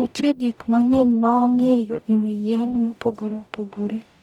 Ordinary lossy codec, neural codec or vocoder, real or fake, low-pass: none; codec, 44.1 kHz, 0.9 kbps, DAC; fake; 19.8 kHz